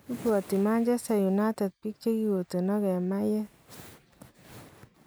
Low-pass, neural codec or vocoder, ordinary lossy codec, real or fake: none; none; none; real